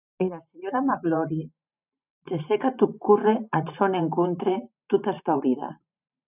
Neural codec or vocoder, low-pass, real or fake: vocoder, 44.1 kHz, 128 mel bands every 512 samples, BigVGAN v2; 3.6 kHz; fake